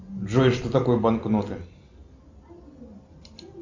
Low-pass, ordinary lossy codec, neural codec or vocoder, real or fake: 7.2 kHz; MP3, 64 kbps; none; real